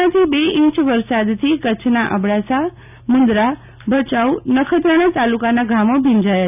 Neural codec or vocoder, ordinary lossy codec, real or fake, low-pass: none; none; real; 3.6 kHz